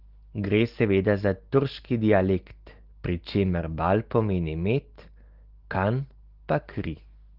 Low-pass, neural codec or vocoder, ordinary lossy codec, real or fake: 5.4 kHz; none; Opus, 16 kbps; real